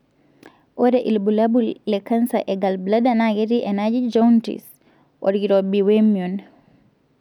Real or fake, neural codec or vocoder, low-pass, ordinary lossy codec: real; none; 19.8 kHz; none